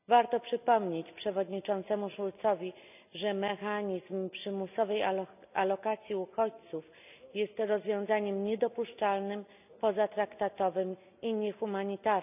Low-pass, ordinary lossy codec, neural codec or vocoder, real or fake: 3.6 kHz; none; none; real